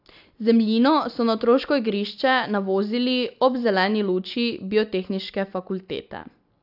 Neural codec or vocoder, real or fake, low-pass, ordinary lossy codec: none; real; 5.4 kHz; AAC, 48 kbps